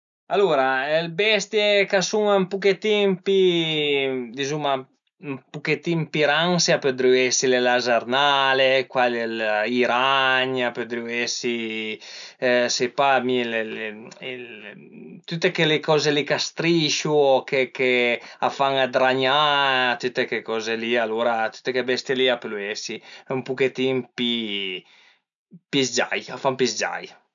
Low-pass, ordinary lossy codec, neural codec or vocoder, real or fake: 7.2 kHz; none; none; real